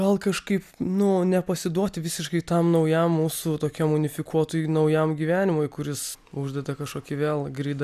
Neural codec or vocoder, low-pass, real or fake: none; 14.4 kHz; real